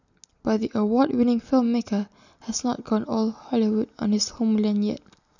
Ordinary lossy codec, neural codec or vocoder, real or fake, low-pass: none; none; real; 7.2 kHz